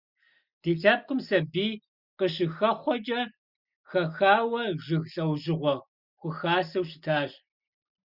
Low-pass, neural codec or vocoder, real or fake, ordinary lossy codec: 5.4 kHz; none; real; Opus, 64 kbps